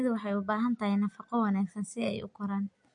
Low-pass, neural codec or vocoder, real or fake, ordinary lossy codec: 9.9 kHz; none; real; MP3, 48 kbps